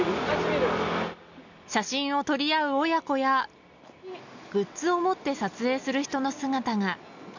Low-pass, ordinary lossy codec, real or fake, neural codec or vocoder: 7.2 kHz; none; real; none